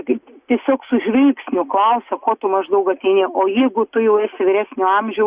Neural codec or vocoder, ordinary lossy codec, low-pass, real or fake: none; Opus, 64 kbps; 3.6 kHz; real